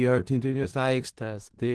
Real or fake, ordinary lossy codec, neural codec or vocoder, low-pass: fake; Opus, 16 kbps; codec, 16 kHz in and 24 kHz out, 0.4 kbps, LongCat-Audio-Codec, four codebook decoder; 10.8 kHz